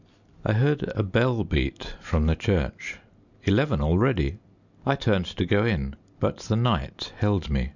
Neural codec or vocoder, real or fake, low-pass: none; real; 7.2 kHz